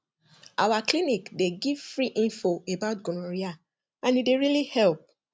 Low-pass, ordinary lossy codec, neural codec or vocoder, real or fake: none; none; none; real